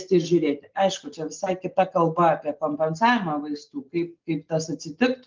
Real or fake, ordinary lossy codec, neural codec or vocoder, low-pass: real; Opus, 32 kbps; none; 7.2 kHz